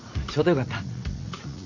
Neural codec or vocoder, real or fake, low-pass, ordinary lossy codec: vocoder, 44.1 kHz, 80 mel bands, Vocos; fake; 7.2 kHz; AAC, 48 kbps